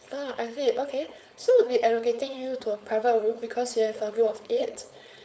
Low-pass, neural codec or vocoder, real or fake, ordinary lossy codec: none; codec, 16 kHz, 4.8 kbps, FACodec; fake; none